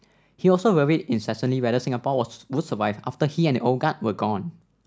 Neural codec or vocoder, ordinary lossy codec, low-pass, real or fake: none; none; none; real